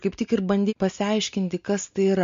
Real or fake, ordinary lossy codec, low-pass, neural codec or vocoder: real; MP3, 48 kbps; 7.2 kHz; none